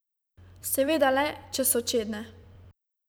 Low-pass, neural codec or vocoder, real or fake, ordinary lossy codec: none; vocoder, 44.1 kHz, 128 mel bands every 256 samples, BigVGAN v2; fake; none